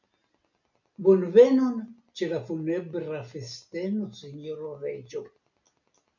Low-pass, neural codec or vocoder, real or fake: 7.2 kHz; none; real